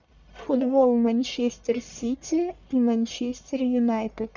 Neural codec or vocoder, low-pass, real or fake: codec, 44.1 kHz, 1.7 kbps, Pupu-Codec; 7.2 kHz; fake